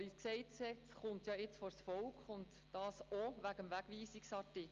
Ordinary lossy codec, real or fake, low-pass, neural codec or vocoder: Opus, 32 kbps; real; 7.2 kHz; none